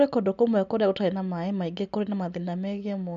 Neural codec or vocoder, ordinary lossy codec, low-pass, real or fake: none; none; 7.2 kHz; real